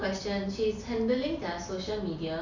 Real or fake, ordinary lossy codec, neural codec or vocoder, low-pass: real; none; none; 7.2 kHz